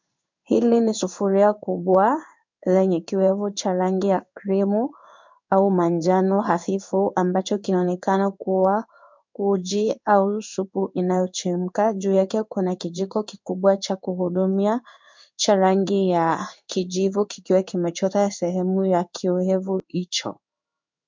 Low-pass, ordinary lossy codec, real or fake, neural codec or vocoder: 7.2 kHz; MP3, 64 kbps; fake; codec, 16 kHz in and 24 kHz out, 1 kbps, XY-Tokenizer